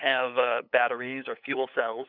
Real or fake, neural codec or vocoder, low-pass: fake; codec, 16 kHz, 8 kbps, FunCodec, trained on LibriTTS, 25 frames a second; 5.4 kHz